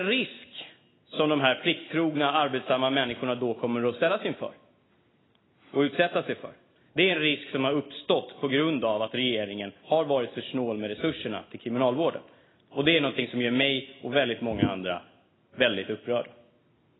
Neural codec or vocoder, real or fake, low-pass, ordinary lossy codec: none; real; 7.2 kHz; AAC, 16 kbps